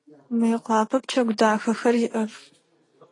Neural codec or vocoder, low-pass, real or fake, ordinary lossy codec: none; 10.8 kHz; real; AAC, 32 kbps